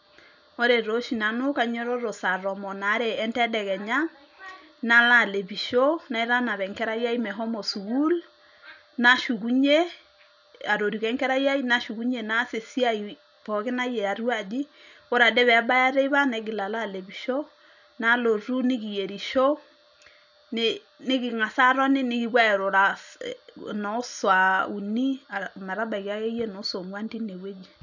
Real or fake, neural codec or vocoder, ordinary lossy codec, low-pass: real; none; none; 7.2 kHz